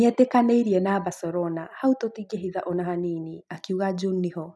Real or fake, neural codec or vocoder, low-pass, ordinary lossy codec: real; none; none; none